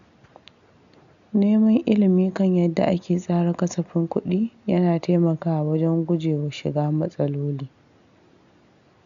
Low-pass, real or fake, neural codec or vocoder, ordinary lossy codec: 7.2 kHz; real; none; none